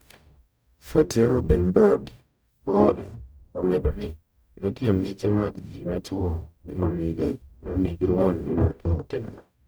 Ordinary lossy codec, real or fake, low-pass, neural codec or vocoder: none; fake; none; codec, 44.1 kHz, 0.9 kbps, DAC